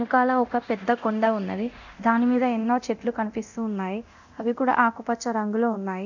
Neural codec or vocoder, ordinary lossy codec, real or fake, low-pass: codec, 24 kHz, 0.9 kbps, DualCodec; none; fake; 7.2 kHz